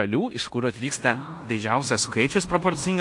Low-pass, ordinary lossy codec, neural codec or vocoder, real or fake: 10.8 kHz; AAC, 64 kbps; codec, 16 kHz in and 24 kHz out, 0.9 kbps, LongCat-Audio-Codec, fine tuned four codebook decoder; fake